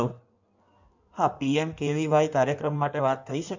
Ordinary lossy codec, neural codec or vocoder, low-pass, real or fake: none; codec, 16 kHz in and 24 kHz out, 1.1 kbps, FireRedTTS-2 codec; 7.2 kHz; fake